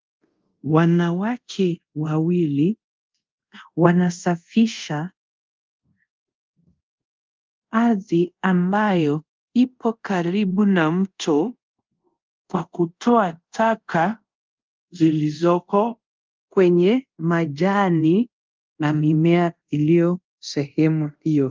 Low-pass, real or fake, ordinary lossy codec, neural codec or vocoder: 7.2 kHz; fake; Opus, 24 kbps; codec, 24 kHz, 0.5 kbps, DualCodec